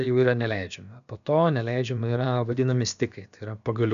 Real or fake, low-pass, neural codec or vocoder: fake; 7.2 kHz; codec, 16 kHz, about 1 kbps, DyCAST, with the encoder's durations